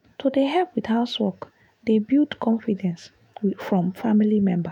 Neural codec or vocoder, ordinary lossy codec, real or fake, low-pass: autoencoder, 48 kHz, 128 numbers a frame, DAC-VAE, trained on Japanese speech; none; fake; 19.8 kHz